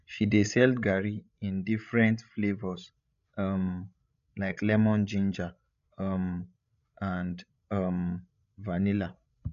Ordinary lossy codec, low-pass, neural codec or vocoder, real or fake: none; 7.2 kHz; codec, 16 kHz, 16 kbps, FreqCodec, larger model; fake